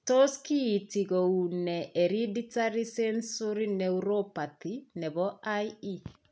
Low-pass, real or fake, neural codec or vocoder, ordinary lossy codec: none; real; none; none